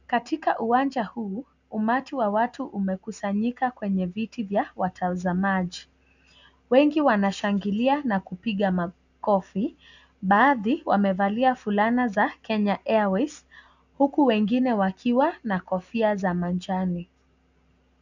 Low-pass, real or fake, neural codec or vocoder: 7.2 kHz; real; none